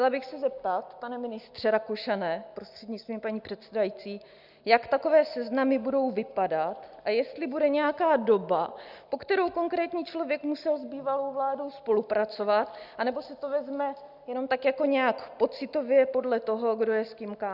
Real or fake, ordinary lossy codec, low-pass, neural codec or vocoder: real; Opus, 64 kbps; 5.4 kHz; none